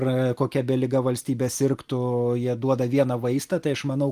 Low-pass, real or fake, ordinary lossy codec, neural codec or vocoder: 14.4 kHz; real; Opus, 32 kbps; none